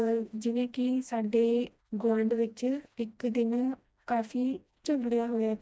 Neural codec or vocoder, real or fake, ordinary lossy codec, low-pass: codec, 16 kHz, 1 kbps, FreqCodec, smaller model; fake; none; none